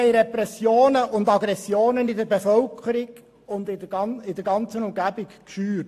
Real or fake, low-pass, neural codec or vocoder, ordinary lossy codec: real; 14.4 kHz; none; AAC, 64 kbps